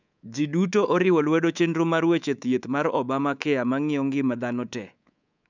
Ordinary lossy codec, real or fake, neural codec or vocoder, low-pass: none; fake; codec, 24 kHz, 3.1 kbps, DualCodec; 7.2 kHz